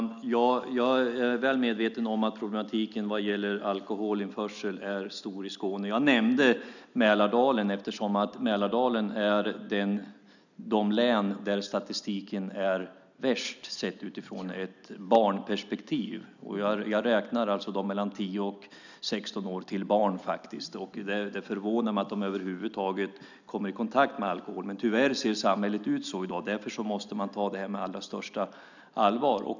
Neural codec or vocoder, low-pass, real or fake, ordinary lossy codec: none; 7.2 kHz; real; none